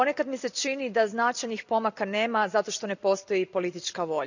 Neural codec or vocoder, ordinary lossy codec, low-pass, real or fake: none; none; 7.2 kHz; real